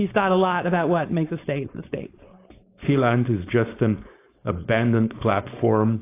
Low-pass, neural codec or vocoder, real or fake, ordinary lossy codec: 3.6 kHz; codec, 16 kHz, 4.8 kbps, FACodec; fake; AAC, 24 kbps